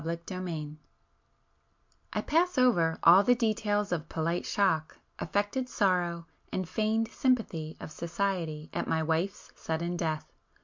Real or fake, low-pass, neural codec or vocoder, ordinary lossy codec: real; 7.2 kHz; none; MP3, 64 kbps